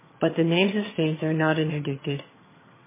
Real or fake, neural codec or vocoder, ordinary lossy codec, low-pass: fake; vocoder, 22.05 kHz, 80 mel bands, HiFi-GAN; MP3, 16 kbps; 3.6 kHz